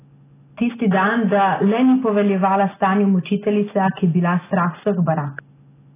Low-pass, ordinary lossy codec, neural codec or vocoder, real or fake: 3.6 kHz; AAC, 16 kbps; none; real